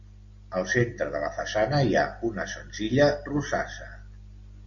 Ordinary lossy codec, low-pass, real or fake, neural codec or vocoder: Opus, 64 kbps; 7.2 kHz; real; none